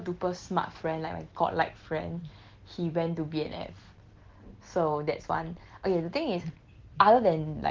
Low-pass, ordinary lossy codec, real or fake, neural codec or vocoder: 7.2 kHz; Opus, 16 kbps; real; none